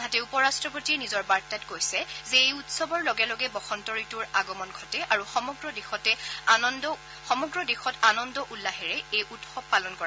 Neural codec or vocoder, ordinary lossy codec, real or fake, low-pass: none; none; real; none